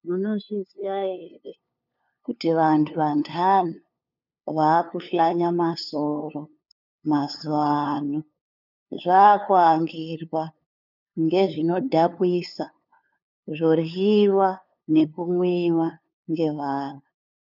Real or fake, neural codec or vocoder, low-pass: fake; codec, 16 kHz, 2 kbps, FunCodec, trained on LibriTTS, 25 frames a second; 5.4 kHz